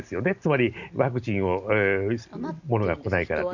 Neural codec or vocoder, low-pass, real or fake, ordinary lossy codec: none; 7.2 kHz; real; none